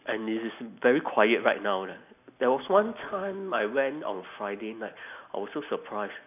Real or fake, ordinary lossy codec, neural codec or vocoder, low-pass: real; none; none; 3.6 kHz